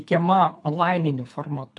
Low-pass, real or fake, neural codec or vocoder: 10.8 kHz; fake; codec, 24 kHz, 3 kbps, HILCodec